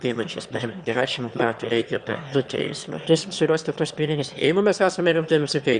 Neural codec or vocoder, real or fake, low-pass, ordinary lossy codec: autoencoder, 22.05 kHz, a latent of 192 numbers a frame, VITS, trained on one speaker; fake; 9.9 kHz; Opus, 64 kbps